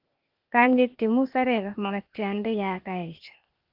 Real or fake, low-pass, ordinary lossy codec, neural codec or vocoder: fake; 5.4 kHz; Opus, 32 kbps; codec, 16 kHz, 0.8 kbps, ZipCodec